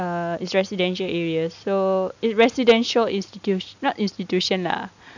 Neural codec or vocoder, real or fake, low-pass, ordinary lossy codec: none; real; 7.2 kHz; none